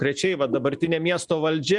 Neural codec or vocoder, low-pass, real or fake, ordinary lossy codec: none; 10.8 kHz; real; Opus, 64 kbps